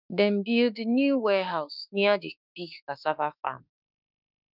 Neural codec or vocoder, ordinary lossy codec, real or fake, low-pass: autoencoder, 48 kHz, 32 numbers a frame, DAC-VAE, trained on Japanese speech; none; fake; 5.4 kHz